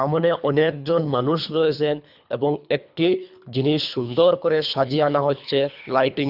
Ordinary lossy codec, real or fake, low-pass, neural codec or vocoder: none; fake; 5.4 kHz; codec, 24 kHz, 3 kbps, HILCodec